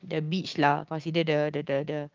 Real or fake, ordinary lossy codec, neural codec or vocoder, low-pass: fake; Opus, 24 kbps; codec, 16 kHz, 6 kbps, DAC; 7.2 kHz